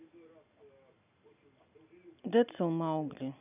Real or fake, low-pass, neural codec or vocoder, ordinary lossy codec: real; 3.6 kHz; none; none